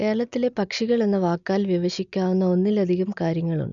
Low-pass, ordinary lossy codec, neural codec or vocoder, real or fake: 7.2 kHz; none; none; real